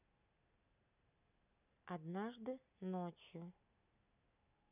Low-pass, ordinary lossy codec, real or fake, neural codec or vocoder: 3.6 kHz; none; real; none